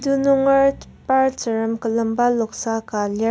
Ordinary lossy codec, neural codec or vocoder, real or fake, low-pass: none; none; real; none